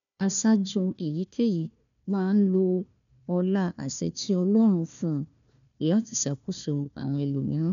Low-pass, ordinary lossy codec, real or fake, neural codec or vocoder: 7.2 kHz; none; fake; codec, 16 kHz, 1 kbps, FunCodec, trained on Chinese and English, 50 frames a second